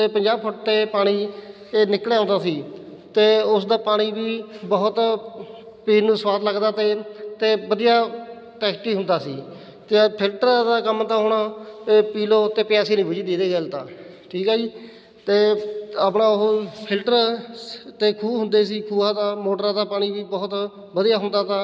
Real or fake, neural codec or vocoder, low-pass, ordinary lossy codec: real; none; none; none